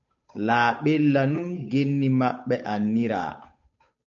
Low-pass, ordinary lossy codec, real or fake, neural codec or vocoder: 7.2 kHz; MP3, 48 kbps; fake; codec, 16 kHz, 8 kbps, FunCodec, trained on Chinese and English, 25 frames a second